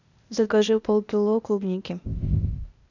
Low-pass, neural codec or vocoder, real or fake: 7.2 kHz; codec, 16 kHz, 0.8 kbps, ZipCodec; fake